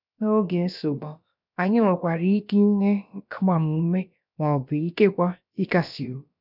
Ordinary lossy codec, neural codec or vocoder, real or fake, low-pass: none; codec, 16 kHz, about 1 kbps, DyCAST, with the encoder's durations; fake; 5.4 kHz